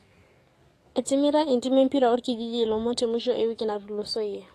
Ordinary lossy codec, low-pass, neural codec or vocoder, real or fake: AAC, 48 kbps; 14.4 kHz; codec, 44.1 kHz, 7.8 kbps, DAC; fake